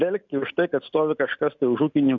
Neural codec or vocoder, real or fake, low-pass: none; real; 7.2 kHz